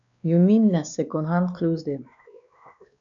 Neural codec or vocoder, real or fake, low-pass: codec, 16 kHz, 2 kbps, X-Codec, WavLM features, trained on Multilingual LibriSpeech; fake; 7.2 kHz